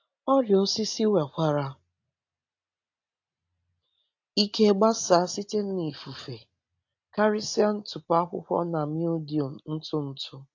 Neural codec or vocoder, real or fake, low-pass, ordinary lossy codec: none; real; 7.2 kHz; AAC, 48 kbps